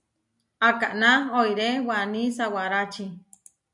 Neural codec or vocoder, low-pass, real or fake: none; 10.8 kHz; real